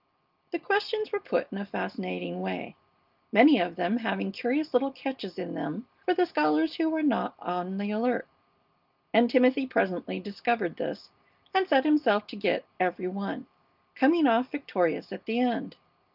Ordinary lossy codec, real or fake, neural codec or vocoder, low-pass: Opus, 24 kbps; real; none; 5.4 kHz